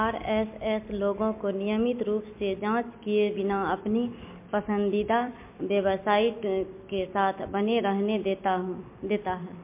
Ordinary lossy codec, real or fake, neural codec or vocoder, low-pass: MP3, 24 kbps; real; none; 3.6 kHz